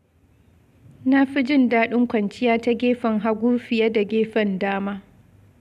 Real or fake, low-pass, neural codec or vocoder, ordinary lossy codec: real; 14.4 kHz; none; none